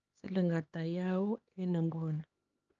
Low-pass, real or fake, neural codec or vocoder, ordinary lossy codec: 7.2 kHz; fake; codec, 16 kHz, 2 kbps, X-Codec, HuBERT features, trained on LibriSpeech; Opus, 24 kbps